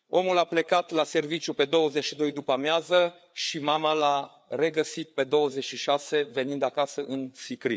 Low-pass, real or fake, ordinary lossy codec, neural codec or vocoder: none; fake; none; codec, 16 kHz, 4 kbps, FreqCodec, larger model